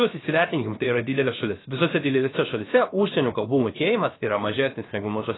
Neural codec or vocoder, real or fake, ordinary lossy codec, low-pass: codec, 16 kHz, 0.7 kbps, FocalCodec; fake; AAC, 16 kbps; 7.2 kHz